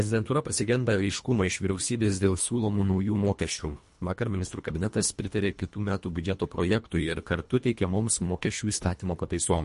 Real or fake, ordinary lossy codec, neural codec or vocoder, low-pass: fake; MP3, 48 kbps; codec, 24 kHz, 1.5 kbps, HILCodec; 10.8 kHz